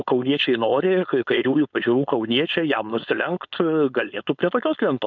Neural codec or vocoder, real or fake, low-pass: codec, 16 kHz, 4.8 kbps, FACodec; fake; 7.2 kHz